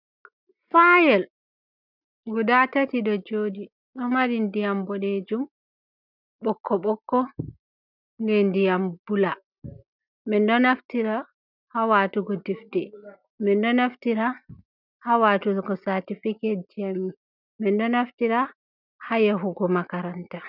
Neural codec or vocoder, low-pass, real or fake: none; 5.4 kHz; real